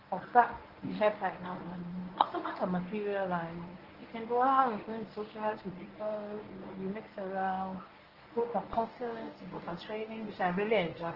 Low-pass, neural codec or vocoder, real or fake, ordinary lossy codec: 5.4 kHz; codec, 24 kHz, 0.9 kbps, WavTokenizer, medium speech release version 1; fake; Opus, 16 kbps